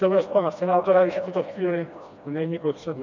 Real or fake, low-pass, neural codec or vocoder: fake; 7.2 kHz; codec, 16 kHz, 1 kbps, FreqCodec, smaller model